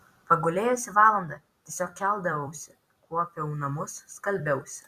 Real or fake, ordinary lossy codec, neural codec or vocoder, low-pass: fake; Opus, 64 kbps; vocoder, 44.1 kHz, 128 mel bands every 256 samples, BigVGAN v2; 14.4 kHz